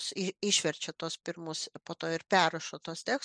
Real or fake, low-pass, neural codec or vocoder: real; 9.9 kHz; none